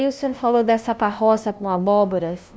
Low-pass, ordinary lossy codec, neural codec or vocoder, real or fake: none; none; codec, 16 kHz, 0.5 kbps, FunCodec, trained on LibriTTS, 25 frames a second; fake